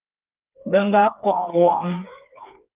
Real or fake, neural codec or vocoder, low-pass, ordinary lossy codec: fake; codec, 16 kHz, 4 kbps, FreqCodec, smaller model; 3.6 kHz; Opus, 32 kbps